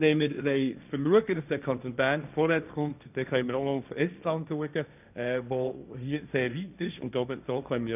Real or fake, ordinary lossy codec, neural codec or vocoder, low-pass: fake; none; codec, 16 kHz, 1.1 kbps, Voila-Tokenizer; 3.6 kHz